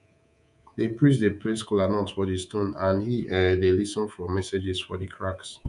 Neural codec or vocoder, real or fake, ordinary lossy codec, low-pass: codec, 24 kHz, 3.1 kbps, DualCodec; fake; none; 10.8 kHz